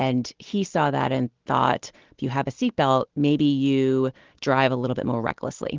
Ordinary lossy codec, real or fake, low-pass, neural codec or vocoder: Opus, 16 kbps; real; 7.2 kHz; none